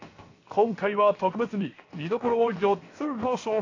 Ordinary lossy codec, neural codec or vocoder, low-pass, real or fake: AAC, 32 kbps; codec, 16 kHz, 0.7 kbps, FocalCodec; 7.2 kHz; fake